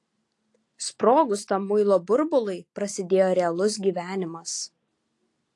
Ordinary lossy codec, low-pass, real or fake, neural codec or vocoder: AAC, 48 kbps; 10.8 kHz; real; none